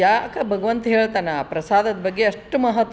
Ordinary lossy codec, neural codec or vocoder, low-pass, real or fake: none; none; none; real